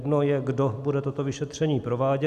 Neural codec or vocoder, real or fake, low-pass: none; real; 14.4 kHz